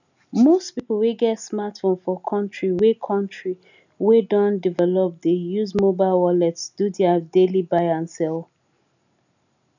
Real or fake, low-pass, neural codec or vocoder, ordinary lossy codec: real; 7.2 kHz; none; none